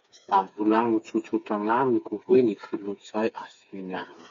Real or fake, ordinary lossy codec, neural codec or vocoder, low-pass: fake; MP3, 48 kbps; codec, 16 kHz, 4 kbps, FreqCodec, smaller model; 7.2 kHz